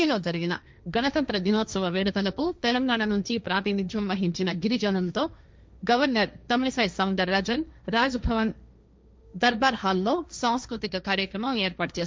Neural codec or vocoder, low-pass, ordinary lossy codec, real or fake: codec, 16 kHz, 1.1 kbps, Voila-Tokenizer; none; none; fake